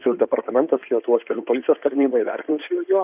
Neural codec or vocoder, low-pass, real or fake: codec, 16 kHz in and 24 kHz out, 2.2 kbps, FireRedTTS-2 codec; 3.6 kHz; fake